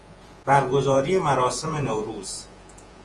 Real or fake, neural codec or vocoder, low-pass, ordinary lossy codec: fake; vocoder, 48 kHz, 128 mel bands, Vocos; 10.8 kHz; Opus, 24 kbps